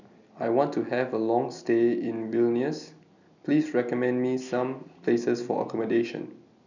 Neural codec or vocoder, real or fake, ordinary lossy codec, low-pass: none; real; none; 7.2 kHz